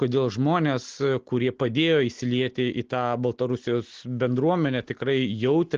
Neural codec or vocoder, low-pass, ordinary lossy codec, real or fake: none; 7.2 kHz; Opus, 16 kbps; real